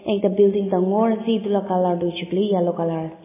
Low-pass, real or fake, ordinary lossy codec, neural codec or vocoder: 3.6 kHz; real; MP3, 16 kbps; none